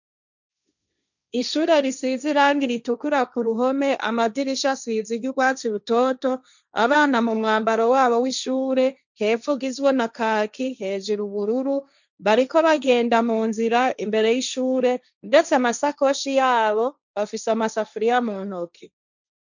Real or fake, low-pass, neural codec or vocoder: fake; 7.2 kHz; codec, 16 kHz, 1.1 kbps, Voila-Tokenizer